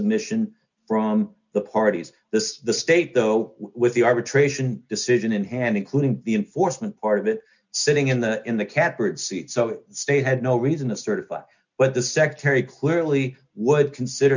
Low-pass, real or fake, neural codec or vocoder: 7.2 kHz; real; none